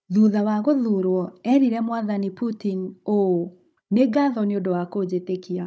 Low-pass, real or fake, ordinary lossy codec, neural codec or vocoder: none; fake; none; codec, 16 kHz, 16 kbps, FunCodec, trained on Chinese and English, 50 frames a second